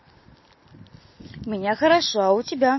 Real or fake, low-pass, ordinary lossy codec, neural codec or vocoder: real; 7.2 kHz; MP3, 24 kbps; none